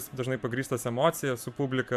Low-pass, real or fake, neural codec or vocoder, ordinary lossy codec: 14.4 kHz; fake; vocoder, 44.1 kHz, 128 mel bands every 512 samples, BigVGAN v2; MP3, 96 kbps